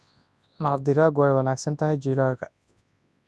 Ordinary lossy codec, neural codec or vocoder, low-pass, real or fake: none; codec, 24 kHz, 0.9 kbps, WavTokenizer, large speech release; none; fake